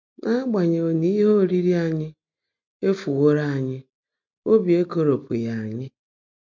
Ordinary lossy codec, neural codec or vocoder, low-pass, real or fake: MP3, 48 kbps; none; 7.2 kHz; real